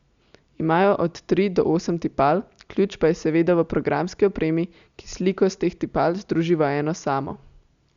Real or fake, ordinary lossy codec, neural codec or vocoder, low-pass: real; Opus, 64 kbps; none; 7.2 kHz